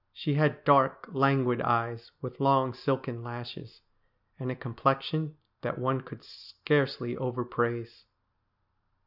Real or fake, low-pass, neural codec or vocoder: real; 5.4 kHz; none